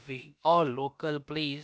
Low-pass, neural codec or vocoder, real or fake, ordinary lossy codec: none; codec, 16 kHz, about 1 kbps, DyCAST, with the encoder's durations; fake; none